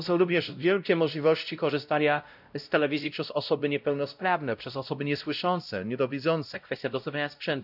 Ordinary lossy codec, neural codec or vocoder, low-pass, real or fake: none; codec, 16 kHz, 0.5 kbps, X-Codec, WavLM features, trained on Multilingual LibriSpeech; 5.4 kHz; fake